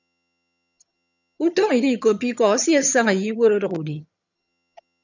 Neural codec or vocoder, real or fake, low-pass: vocoder, 22.05 kHz, 80 mel bands, HiFi-GAN; fake; 7.2 kHz